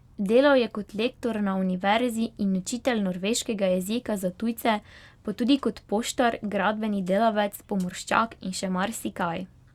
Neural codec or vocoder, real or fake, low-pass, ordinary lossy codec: none; real; 19.8 kHz; Opus, 64 kbps